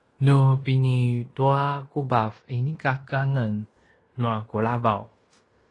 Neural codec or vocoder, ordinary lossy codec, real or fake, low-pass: codec, 16 kHz in and 24 kHz out, 0.9 kbps, LongCat-Audio-Codec, fine tuned four codebook decoder; AAC, 32 kbps; fake; 10.8 kHz